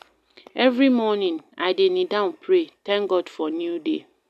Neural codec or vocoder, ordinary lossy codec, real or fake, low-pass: none; none; real; 14.4 kHz